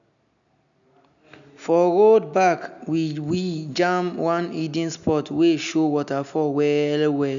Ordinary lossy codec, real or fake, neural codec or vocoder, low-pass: none; real; none; 7.2 kHz